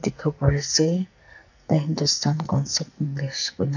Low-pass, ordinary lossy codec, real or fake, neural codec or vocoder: 7.2 kHz; none; fake; codec, 44.1 kHz, 2.6 kbps, SNAC